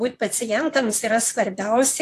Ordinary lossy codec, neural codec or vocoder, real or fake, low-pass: AAC, 64 kbps; vocoder, 44.1 kHz, 128 mel bands, Pupu-Vocoder; fake; 14.4 kHz